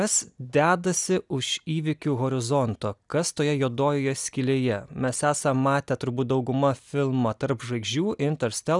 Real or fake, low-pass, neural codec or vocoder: real; 10.8 kHz; none